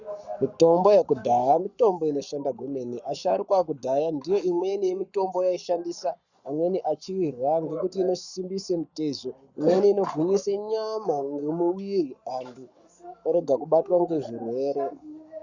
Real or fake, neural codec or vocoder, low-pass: fake; codec, 44.1 kHz, 7.8 kbps, Pupu-Codec; 7.2 kHz